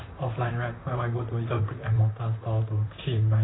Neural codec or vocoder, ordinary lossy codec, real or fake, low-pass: vocoder, 44.1 kHz, 128 mel bands, Pupu-Vocoder; AAC, 16 kbps; fake; 7.2 kHz